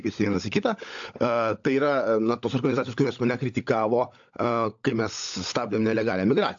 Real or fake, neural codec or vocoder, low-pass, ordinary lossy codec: fake; codec, 16 kHz, 16 kbps, FunCodec, trained on LibriTTS, 50 frames a second; 7.2 kHz; AAC, 64 kbps